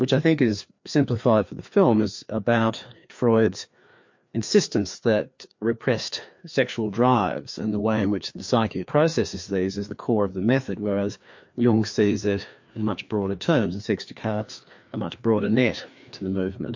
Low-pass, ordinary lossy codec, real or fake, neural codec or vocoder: 7.2 kHz; MP3, 48 kbps; fake; codec, 16 kHz, 2 kbps, FreqCodec, larger model